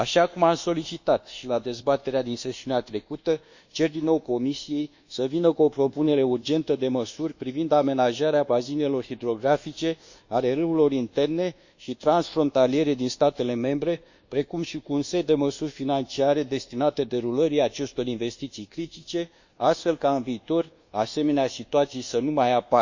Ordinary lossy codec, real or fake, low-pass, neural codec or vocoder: Opus, 64 kbps; fake; 7.2 kHz; codec, 24 kHz, 1.2 kbps, DualCodec